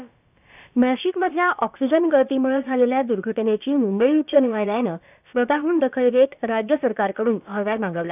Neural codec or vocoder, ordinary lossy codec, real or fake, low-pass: codec, 16 kHz, about 1 kbps, DyCAST, with the encoder's durations; none; fake; 3.6 kHz